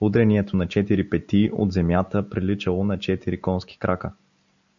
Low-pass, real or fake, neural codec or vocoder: 7.2 kHz; real; none